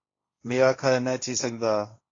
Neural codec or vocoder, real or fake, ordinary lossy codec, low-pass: codec, 16 kHz, 1.1 kbps, Voila-Tokenizer; fake; AAC, 32 kbps; 7.2 kHz